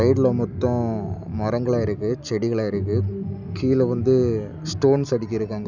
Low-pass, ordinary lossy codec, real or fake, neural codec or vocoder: 7.2 kHz; none; real; none